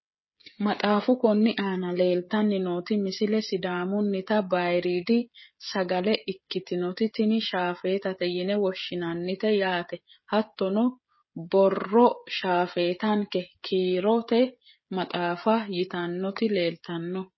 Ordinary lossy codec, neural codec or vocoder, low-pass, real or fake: MP3, 24 kbps; codec, 16 kHz, 16 kbps, FreqCodec, smaller model; 7.2 kHz; fake